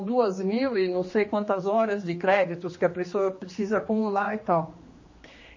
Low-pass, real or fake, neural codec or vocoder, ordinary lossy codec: 7.2 kHz; fake; codec, 16 kHz, 2 kbps, X-Codec, HuBERT features, trained on general audio; MP3, 32 kbps